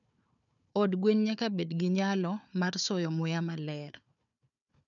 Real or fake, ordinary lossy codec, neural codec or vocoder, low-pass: fake; none; codec, 16 kHz, 4 kbps, FunCodec, trained on Chinese and English, 50 frames a second; 7.2 kHz